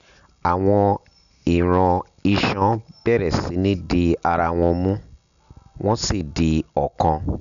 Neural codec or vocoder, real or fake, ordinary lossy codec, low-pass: none; real; none; 7.2 kHz